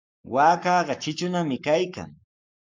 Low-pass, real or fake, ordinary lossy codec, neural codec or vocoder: 7.2 kHz; fake; MP3, 64 kbps; codec, 44.1 kHz, 7.8 kbps, Pupu-Codec